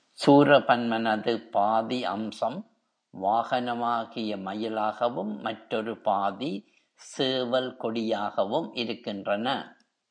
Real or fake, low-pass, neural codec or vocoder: real; 9.9 kHz; none